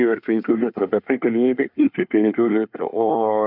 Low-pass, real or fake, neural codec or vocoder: 5.4 kHz; fake; codec, 24 kHz, 1 kbps, SNAC